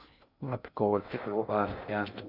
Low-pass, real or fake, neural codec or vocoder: 5.4 kHz; fake; codec, 16 kHz in and 24 kHz out, 0.6 kbps, FocalCodec, streaming, 2048 codes